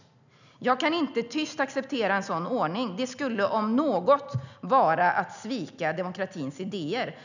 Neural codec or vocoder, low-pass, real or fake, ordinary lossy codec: none; 7.2 kHz; real; none